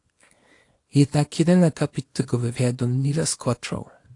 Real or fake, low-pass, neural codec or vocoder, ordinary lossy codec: fake; 10.8 kHz; codec, 24 kHz, 0.9 kbps, WavTokenizer, small release; AAC, 48 kbps